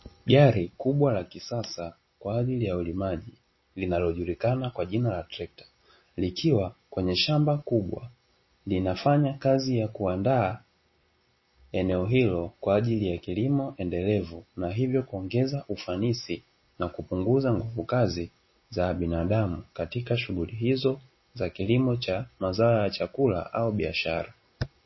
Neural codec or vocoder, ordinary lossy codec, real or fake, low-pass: none; MP3, 24 kbps; real; 7.2 kHz